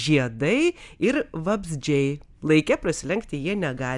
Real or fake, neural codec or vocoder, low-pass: real; none; 10.8 kHz